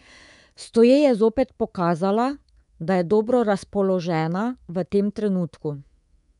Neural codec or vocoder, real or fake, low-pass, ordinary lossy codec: codec, 24 kHz, 3.1 kbps, DualCodec; fake; 10.8 kHz; none